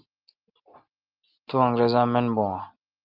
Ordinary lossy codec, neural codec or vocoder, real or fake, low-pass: Opus, 24 kbps; none; real; 5.4 kHz